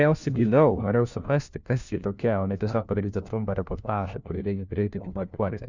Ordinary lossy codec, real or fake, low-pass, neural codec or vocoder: Opus, 64 kbps; fake; 7.2 kHz; codec, 16 kHz, 1 kbps, FunCodec, trained on LibriTTS, 50 frames a second